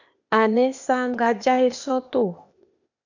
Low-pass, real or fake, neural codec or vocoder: 7.2 kHz; fake; codec, 16 kHz, 0.8 kbps, ZipCodec